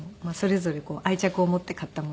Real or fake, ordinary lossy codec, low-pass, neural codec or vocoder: real; none; none; none